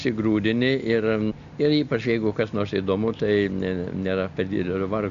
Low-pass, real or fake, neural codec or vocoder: 7.2 kHz; real; none